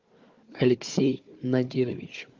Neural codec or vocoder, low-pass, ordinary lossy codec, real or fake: codec, 16 kHz, 4 kbps, FunCodec, trained on Chinese and English, 50 frames a second; 7.2 kHz; Opus, 32 kbps; fake